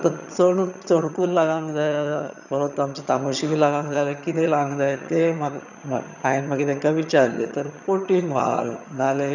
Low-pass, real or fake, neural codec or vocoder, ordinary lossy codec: 7.2 kHz; fake; vocoder, 22.05 kHz, 80 mel bands, HiFi-GAN; none